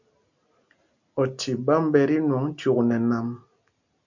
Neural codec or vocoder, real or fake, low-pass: none; real; 7.2 kHz